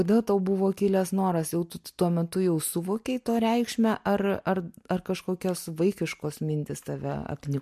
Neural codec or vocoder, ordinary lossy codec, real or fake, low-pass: none; MP3, 64 kbps; real; 14.4 kHz